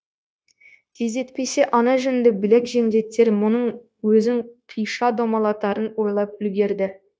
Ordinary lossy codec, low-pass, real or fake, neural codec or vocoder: none; none; fake; codec, 16 kHz, 0.9 kbps, LongCat-Audio-Codec